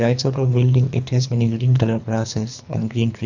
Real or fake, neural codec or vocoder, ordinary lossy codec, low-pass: fake; codec, 24 kHz, 3 kbps, HILCodec; none; 7.2 kHz